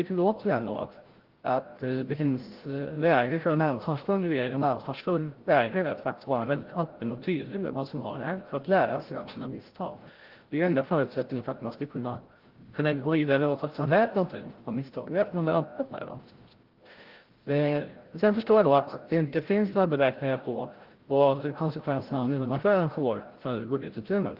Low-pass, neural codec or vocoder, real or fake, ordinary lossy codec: 5.4 kHz; codec, 16 kHz, 0.5 kbps, FreqCodec, larger model; fake; Opus, 16 kbps